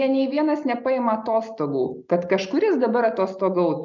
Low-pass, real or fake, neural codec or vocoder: 7.2 kHz; real; none